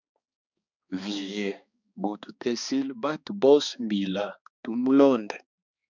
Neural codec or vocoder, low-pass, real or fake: codec, 16 kHz, 2 kbps, X-Codec, HuBERT features, trained on balanced general audio; 7.2 kHz; fake